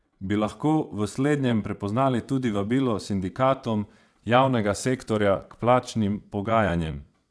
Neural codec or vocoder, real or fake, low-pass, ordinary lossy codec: vocoder, 22.05 kHz, 80 mel bands, WaveNeXt; fake; none; none